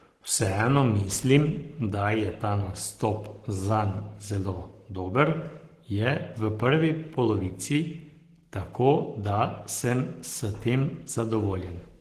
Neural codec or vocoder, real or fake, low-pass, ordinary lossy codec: codec, 44.1 kHz, 7.8 kbps, Pupu-Codec; fake; 14.4 kHz; Opus, 16 kbps